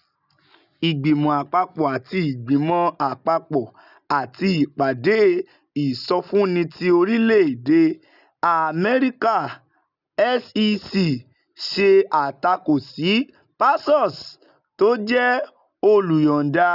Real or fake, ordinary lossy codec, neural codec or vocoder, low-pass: real; none; none; 5.4 kHz